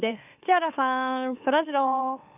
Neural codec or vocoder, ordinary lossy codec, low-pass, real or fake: autoencoder, 44.1 kHz, a latent of 192 numbers a frame, MeloTTS; none; 3.6 kHz; fake